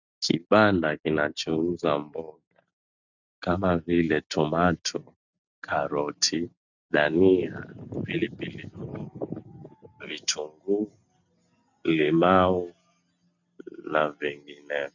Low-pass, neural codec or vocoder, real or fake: 7.2 kHz; none; real